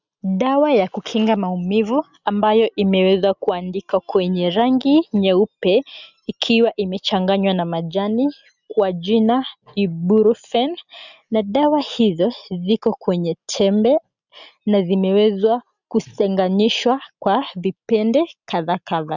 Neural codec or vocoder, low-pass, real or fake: none; 7.2 kHz; real